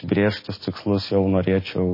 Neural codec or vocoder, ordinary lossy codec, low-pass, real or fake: none; MP3, 24 kbps; 5.4 kHz; real